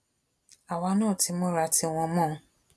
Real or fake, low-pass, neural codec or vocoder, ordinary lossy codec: real; none; none; none